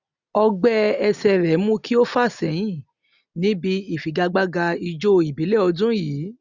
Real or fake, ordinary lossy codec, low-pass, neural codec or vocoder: real; none; 7.2 kHz; none